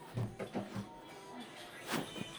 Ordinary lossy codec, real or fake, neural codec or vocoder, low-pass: none; real; none; none